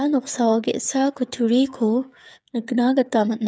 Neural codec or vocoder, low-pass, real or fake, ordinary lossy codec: codec, 16 kHz, 8 kbps, FreqCodec, smaller model; none; fake; none